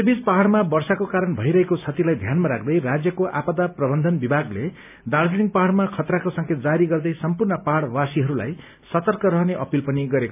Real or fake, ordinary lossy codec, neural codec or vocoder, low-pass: real; none; none; 3.6 kHz